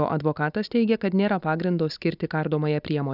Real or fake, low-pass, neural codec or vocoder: real; 5.4 kHz; none